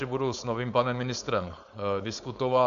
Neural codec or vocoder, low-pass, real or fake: codec, 16 kHz, 4.8 kbps, FACodec; 7.2 kHz; fake